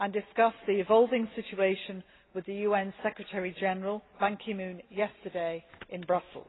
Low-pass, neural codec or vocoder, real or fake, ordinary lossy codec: 7.2 kHz; none; real; AAC, 16 kbps